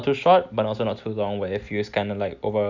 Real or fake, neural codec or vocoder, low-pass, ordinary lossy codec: real; none; 7.2 kHz; none